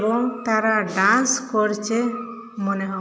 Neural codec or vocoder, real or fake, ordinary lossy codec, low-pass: none; real; none; none